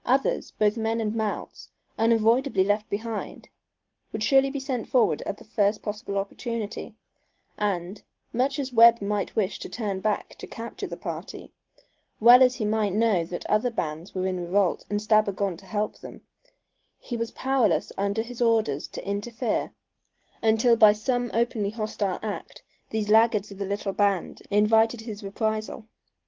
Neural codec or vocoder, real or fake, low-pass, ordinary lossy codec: none; real; 7.2 kHz; Opus, 16 kbps